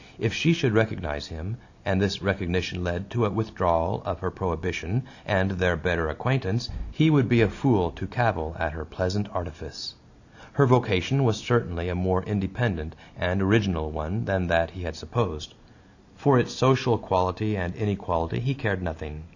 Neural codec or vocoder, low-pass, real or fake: none; 7.2 kHz; real